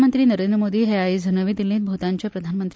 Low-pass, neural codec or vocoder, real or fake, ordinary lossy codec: none; none; real; none